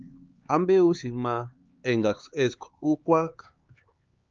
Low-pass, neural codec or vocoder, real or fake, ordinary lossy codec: 7.2 kHz; codec, 16 kHz, 4 kbps, X-Codec, HuBERT features, trained on LibriSpeech; fake; Opus, 24 kbps